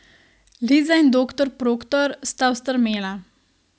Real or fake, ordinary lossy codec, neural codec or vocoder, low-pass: real; none; none; none